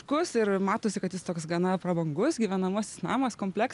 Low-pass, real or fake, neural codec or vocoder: 10.8 kHz; real; none